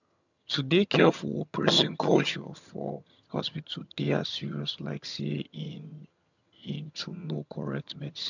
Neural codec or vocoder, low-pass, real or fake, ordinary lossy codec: vocoder, 22.05 kHz, 80 mel bands, HiFi-GAN; 7.2 kHz; fake; none